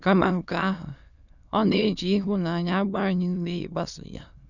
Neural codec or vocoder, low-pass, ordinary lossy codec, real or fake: autoencoder, 22.05 kHz, a latent of 192 numbers a frame, VITS, trained on many speakers; 7.2 kHz; none; fake